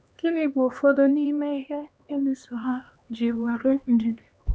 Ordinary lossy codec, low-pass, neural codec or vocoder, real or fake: none; none; codec, 16 kHz, 2 kbps, X-Codec, HuBERT features, trained on LibriSpeech; fake